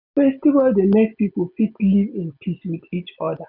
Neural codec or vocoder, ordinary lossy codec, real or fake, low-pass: none; none; real; 5.4 kHz